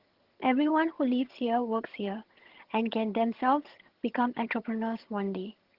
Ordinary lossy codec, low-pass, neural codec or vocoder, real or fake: Opus, 16 kbps; 5.4 kHz; vocoder, 22.05 kHz, 80 mel bands, HiFi-GAN; fake